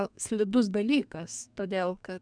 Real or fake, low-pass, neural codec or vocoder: fake; 9.9 kHz; codec, 32 kHz, 1.9 kbps, SNAC